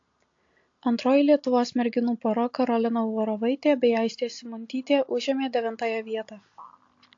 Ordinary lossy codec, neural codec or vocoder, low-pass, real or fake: AAC, 48 kbps; none; 7.2 kHz; real